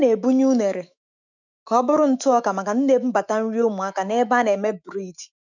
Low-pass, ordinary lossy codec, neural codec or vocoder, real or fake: 7.2 kHz; none; autoencoder, 48 kHz, 128 numbers a frame, DAC-VAE, trained on Japanese speech; fake